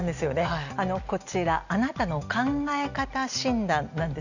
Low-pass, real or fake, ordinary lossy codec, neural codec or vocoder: 7.2 kHz; real; none; none